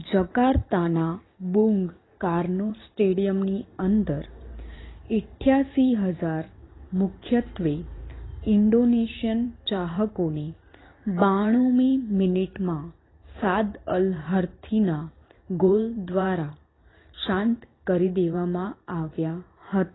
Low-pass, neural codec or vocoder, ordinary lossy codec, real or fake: 7.2 kHz; vocoder, 44.1 kHz, 128 mel bands every 512 samples, BigVGAN v2; AAC, 16 kbps; fake